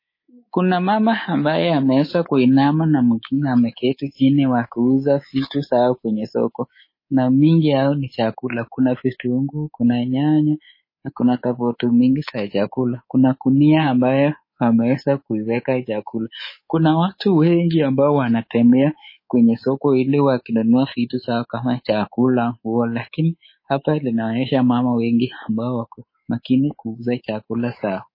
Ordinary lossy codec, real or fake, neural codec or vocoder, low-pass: MP3, 24 kbps; fake; codec, 24 kHz, 3.1 kbps, DualCodec; 5.4 kHz